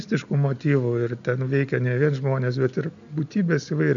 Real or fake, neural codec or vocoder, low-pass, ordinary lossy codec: real; none; 7.2 kHz; AAC, 64 kbps